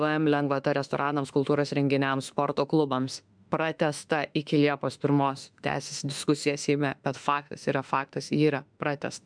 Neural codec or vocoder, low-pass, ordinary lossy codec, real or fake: autoencoder, 48 kHz, 32 numbers a frame, DAC-VAE, trained on Japanese speech; 9.9 kHz; MP3, 96 kbps; fake